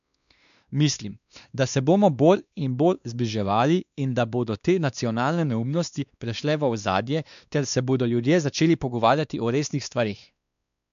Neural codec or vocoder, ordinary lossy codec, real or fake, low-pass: codec, 16 kHz, 2 kbps, X-Codec, WavLM features, trained on Multilingual LibriSpeech; none; fake; 7.2 kHz